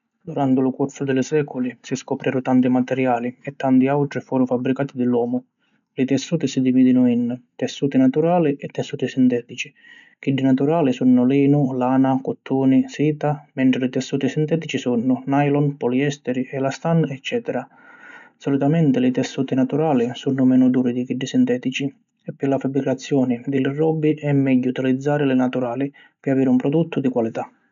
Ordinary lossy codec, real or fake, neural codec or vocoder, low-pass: none; real; none; 7.2 kHz